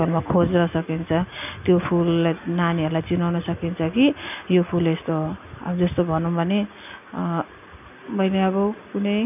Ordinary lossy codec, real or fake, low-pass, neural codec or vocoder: none; real; 3.6 kHz; none